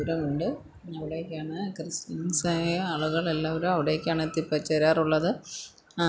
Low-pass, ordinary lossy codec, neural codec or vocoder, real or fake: none; none; none; real